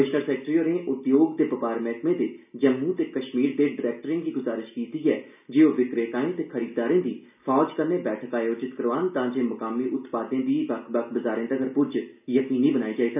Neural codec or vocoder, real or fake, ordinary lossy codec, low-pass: none; real; none; 3.6 kHz